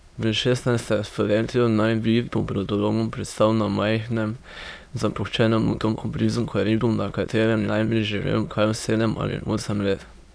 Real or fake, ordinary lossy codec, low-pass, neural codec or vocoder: fake; none; none; autoencoder, 22.05 kHz, a latent of 192 numbers a frame, VITS, trained on many speakers